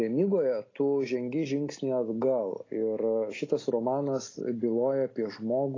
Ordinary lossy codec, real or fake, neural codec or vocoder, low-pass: AAC, 32 kbps; real; none; 7.2 kHz